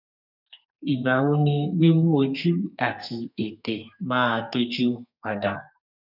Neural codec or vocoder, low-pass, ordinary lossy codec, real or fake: codec, 32 kHz, 1.9 kbps, SNAC; 5.4 kHz; none; fake